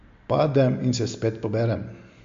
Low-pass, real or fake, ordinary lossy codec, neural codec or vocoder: 7.2 kHz; real; MP3, 48 kbps; none